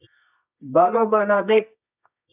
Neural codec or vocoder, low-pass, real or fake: codec, 24 kHz, 0.9 kbps, WavTokenizer, medium music audio release; 3.6 kHz; fake